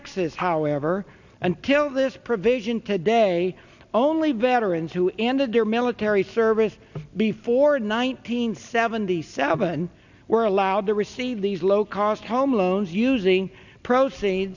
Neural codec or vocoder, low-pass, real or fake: none; 7.2 kHz; real